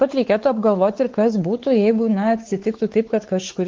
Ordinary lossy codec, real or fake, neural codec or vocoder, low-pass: Opus, 16 kbps; fake; codec, 16 kHz, 4 kbps, X-Codec, WavLM features, trained on Multilingual LibriSpeech; 7.2 kHz